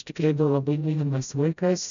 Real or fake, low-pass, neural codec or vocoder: fake; 7.2 kHz; codec, 16 kHz, 0.5 kbps, FreqCodec, smaller model